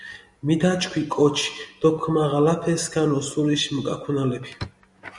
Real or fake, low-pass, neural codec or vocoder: real; 10.8 kHz; none